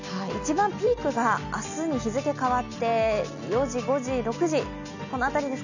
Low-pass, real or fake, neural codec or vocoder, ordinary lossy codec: 7.2 kHz; real; none; none